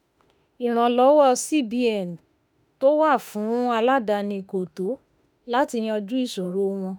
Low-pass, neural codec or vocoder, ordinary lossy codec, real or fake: none; autoencoder, 48 kHz, 32 numbers a frame, DAC-VAE, trained on Japanese speech; none; fake